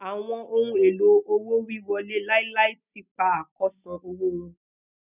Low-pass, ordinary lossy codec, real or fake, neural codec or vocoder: 3.6 kHz; none; real; none